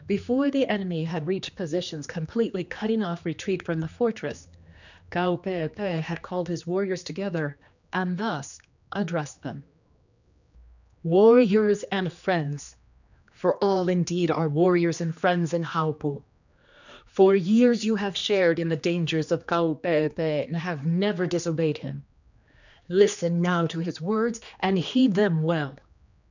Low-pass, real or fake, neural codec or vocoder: 7.2 kHz; fake; codec, 16 kHz, 2 kbps, X-Codec, HuBERT features, trained on general audio